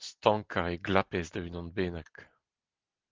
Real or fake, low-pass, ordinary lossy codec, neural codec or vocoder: real; 7.2 kHz; Opus, 16 kbps; none